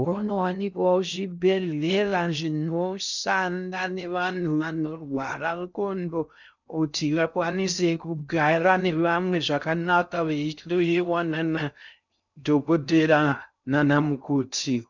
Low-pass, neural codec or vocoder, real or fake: 7.2 kHz; codec, 16 kHz in and 24 kHz out, 0.6 kbps, FocalCodec, streaming, 2048 codes; fake